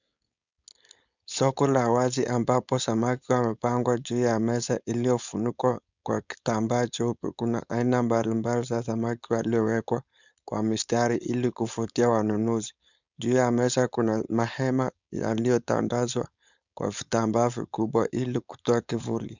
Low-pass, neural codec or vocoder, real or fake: 7.2 kHz; codec, 16 kHz, 4.8 kbps, FACodec; fake